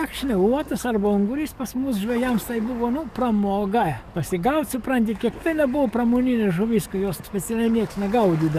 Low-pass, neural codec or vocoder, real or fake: 14.4 kHz; codec, 44.1 kHz, 7.8 kbps, DAC; fake